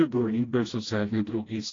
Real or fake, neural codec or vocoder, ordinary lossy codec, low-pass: fake; codec, 16 kHz, 1 kbps, FreqCodec, smaller model; AAC, 32 kbps; 7.2 kHz